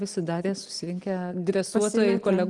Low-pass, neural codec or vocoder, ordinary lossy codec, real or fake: 10.8 kHz; none; Opus, 24 kbps; real